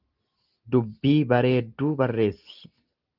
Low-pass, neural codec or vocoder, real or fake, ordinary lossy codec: 5.4 kHz; none; real; Opus, 32 kbps